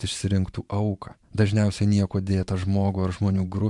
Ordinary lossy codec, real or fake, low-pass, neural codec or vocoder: MP3, 64 kbps; fake; 10.8 kHz; vocoder, 44.1 kHz, 128 mel bands every 512 samples, BigVGAN v2